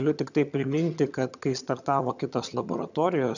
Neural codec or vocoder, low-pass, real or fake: vocoder, 22.05 kHz, 80 mel bands, HiFi-GAN; 7.2 kHz; fake